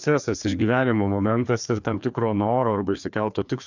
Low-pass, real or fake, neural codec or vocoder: 7.2 kHz; fake; codec, 16 kHz, 2 kbps, FreqCodec, larger model